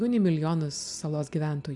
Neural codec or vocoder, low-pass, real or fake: none; 10.8 kHz; real